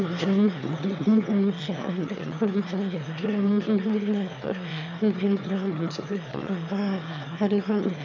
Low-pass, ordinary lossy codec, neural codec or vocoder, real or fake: 7.2 kHz; MP3, 64 kbps; autoencoder, 22.05 kHz, a latent of 192 numbers a frame, VITS, trained on one speaker; fake